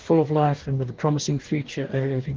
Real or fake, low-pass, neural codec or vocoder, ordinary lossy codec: fake; 7.2 kHz; codec, 44.1 kHz, 2.6 kbps, DAC; Opus, 16 kbps